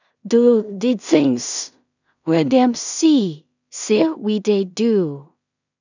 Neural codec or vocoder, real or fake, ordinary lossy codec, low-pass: codec, 16 kHz in and 24 kHz out, 0.4 kbps, LongCat-Audio-Codec, two codebook decoder; fake; none; 7.2 kHz